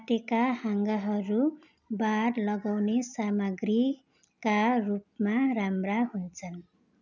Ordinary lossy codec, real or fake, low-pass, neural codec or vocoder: none; real; 7.2 kHz; none